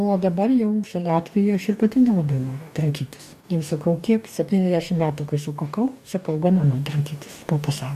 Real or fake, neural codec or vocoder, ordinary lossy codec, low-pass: fake; codec, 44.1 kHz, 2.6 kbps, DAC; AAC, 96 kbps; 14.4 kHz